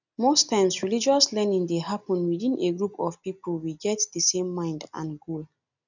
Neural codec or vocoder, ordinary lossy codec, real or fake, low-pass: none; none; real; 7.2 kHz